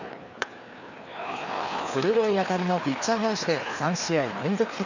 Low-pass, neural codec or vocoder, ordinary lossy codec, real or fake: 7.2 kHz; codec, 16 kHz, 2 kbps, FreqCodec, larger model; none; fake